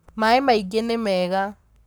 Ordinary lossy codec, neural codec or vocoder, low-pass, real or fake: none; codec, 44.1 kHz, 7.8 kbps, Pupu-Codec; none; fake